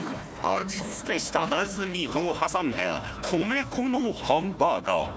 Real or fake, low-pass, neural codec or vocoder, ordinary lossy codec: fake; none; codec, 16 kHz, 1 kbps, FunCodec, trained on Chinese and English, 50 frames a second; none